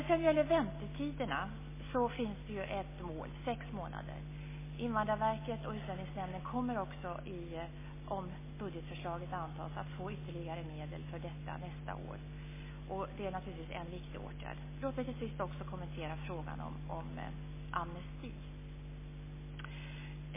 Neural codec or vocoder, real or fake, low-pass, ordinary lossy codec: none; real; 3.6 kHz; MP3, 16 kbps